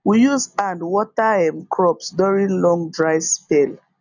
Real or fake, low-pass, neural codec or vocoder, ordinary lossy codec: real; 7.2 kHz; none; none